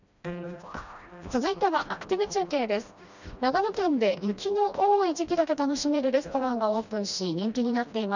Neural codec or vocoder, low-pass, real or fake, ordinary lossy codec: codec, 16 kHz, 1 kbps, FreqCodec, smaller model; 7.2 kHz; fake; none